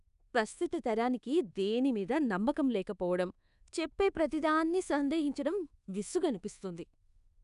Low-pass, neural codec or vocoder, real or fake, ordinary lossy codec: 10.8 kHz; codec, 24 kHz, 1.2 kbps, DualCodec; fake; none